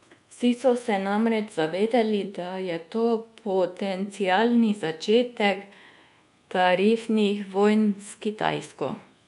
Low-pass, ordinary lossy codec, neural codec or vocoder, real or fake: 10.8 kHz; MP3, 96 kbps; codec, 24 kHz, 1.2 kbps, DualCodec; fake